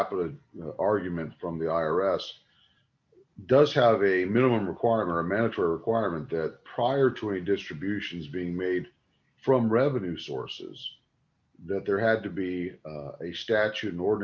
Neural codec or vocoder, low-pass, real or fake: none; 7.2 kHz; real